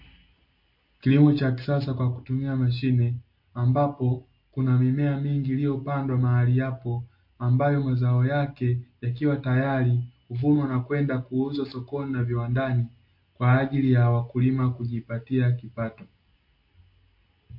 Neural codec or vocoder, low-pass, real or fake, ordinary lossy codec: none; 5.4 kHz; real; MP3, 32 kbps